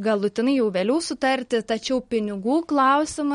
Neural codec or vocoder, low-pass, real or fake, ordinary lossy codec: none; 19.8 kHz; real; MP3, 48 kbps